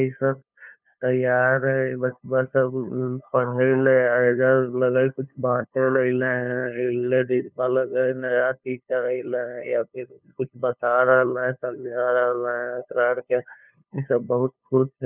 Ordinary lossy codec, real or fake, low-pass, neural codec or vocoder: none; fake; 3.6 kHz; codec, 16 kHz, 2 kbps, FunCodec, trained on LibriTTS, 25 frames a second